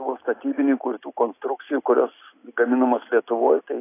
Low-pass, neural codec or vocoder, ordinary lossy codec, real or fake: 3.6 kHz; none; AAC, 24 kbps; real